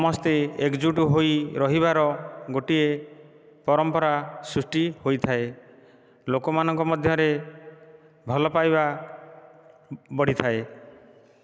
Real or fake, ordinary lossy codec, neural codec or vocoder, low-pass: real; none; none; none